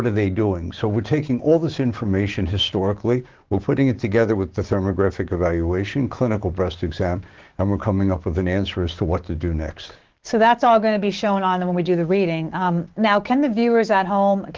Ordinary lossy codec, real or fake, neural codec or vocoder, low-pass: Opus, 16 kbps; fake; codec, 16 kHz, 6 kbps, DAC; 7.2 kHz